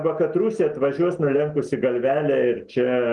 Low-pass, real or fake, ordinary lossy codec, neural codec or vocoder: 10.8 kHz; real; Opus, 24 kbps; none